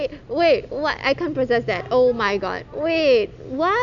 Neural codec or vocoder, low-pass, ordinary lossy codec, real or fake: none; 7.2 kHz; none; real